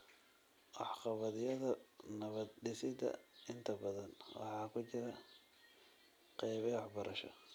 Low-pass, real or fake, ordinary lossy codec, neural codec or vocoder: none; real; none; none